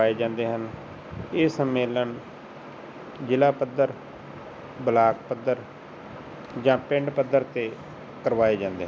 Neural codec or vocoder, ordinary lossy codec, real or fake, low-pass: none; none; real; none